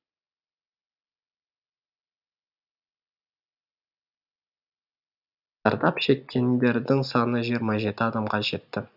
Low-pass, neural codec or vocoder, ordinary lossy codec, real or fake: 5.4 kHz; none; none; real